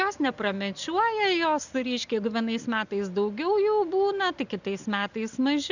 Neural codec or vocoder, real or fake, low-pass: none; real; 7.2 kHz